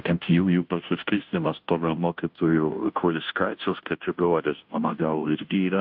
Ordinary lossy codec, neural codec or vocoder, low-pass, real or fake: AAC, 48 kbps; codec, 16 kHz, 0.5 kbps, FunCodec, trained on Chinese and English, 25 frames a second; 5.4 kHz; fake